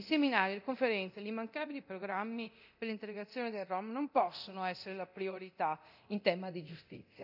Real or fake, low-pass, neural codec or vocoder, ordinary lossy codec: fake; 5.4 kHz; codec, 24 kHz, 0.9 kbps, DualCodec; none